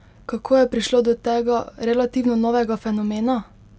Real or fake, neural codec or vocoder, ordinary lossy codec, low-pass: real; none; none; none